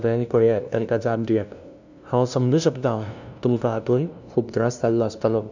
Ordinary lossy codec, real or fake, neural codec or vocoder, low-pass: none; fake; codec, 16 kHz, 0.5 kbps, FunCodec, trained on LibriTTS, 25 frames a second; 7.2 kHz